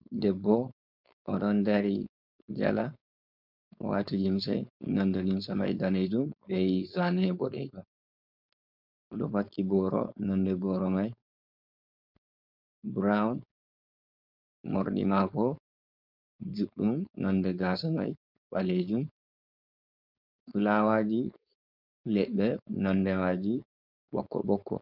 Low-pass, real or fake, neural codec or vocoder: 5.4 kHz; fake; codec, 16 kHz, 4.8 kbps, FACodec